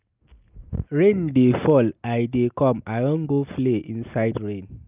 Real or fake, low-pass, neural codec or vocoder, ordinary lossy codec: real; 3.6 kHz; none; Opus, 24 kbps